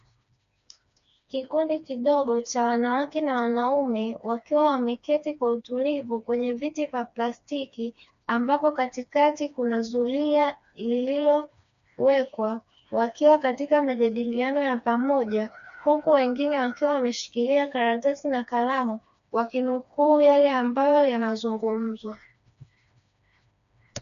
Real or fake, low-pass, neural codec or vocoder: fake; 7.2 kHz; codec, 16 kHz, 2 kbps, FreqCodec, smaller model